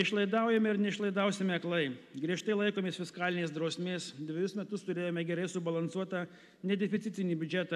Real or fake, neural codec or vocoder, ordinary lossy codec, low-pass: real; none; AAC, 96 kbps; 14.4 kHz